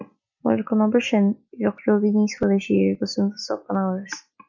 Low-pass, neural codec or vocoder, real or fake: 7.2 kHz; none; real